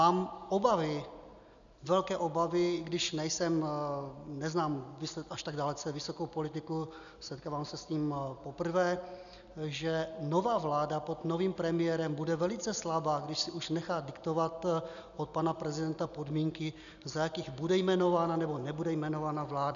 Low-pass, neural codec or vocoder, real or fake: 7.2 kHz; none; real